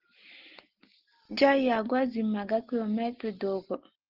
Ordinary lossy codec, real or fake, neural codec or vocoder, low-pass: Opus, 16 kbps; real; none; 5.4 kHz